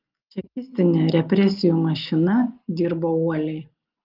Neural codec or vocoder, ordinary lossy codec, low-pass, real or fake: none; Opus, 32 kbps; 5.4 kHz; real